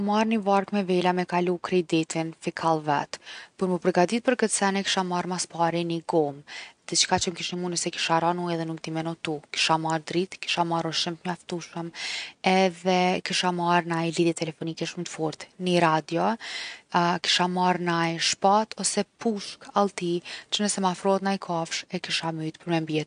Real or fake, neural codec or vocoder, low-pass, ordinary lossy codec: real; none; 9.9 kHz; none